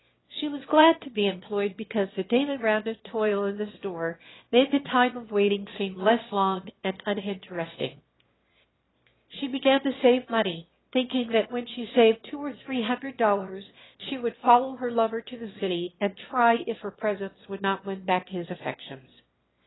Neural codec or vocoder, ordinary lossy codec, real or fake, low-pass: autoencoder, 22.05 kHz, a latent of 192 numbers a frame, VITS, trained on one speaker; AAC, 16 kbps; fake; 7.2 kHz